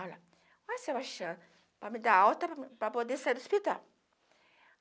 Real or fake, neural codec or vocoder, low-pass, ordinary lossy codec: real; none; none; none